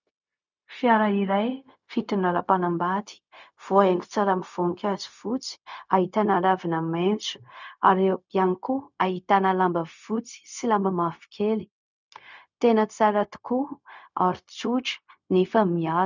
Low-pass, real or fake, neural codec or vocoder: 7.2 kHz; fake; codec, 16 kHz, 0.4 kbps, LongCat-Audio-Codec